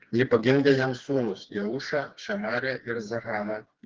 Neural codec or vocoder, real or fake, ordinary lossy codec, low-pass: codec, 16 kHz, 2 kbps, FreqCodec, smaller model; fake; Opus, 16 kbps; 7.2 kHz